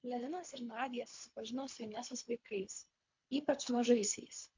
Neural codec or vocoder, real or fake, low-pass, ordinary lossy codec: codec, 24 kHz, 3 kbps, HILCodec; fake; 7.2 kHz; MP3, 48 kbps